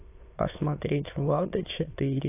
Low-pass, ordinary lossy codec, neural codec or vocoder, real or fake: 3.6 kHz; AAC, 24 kbps; autoencoder, 22.05 kHz, a latent of 192 numbers a frame, VITS, trained on many speakers; fake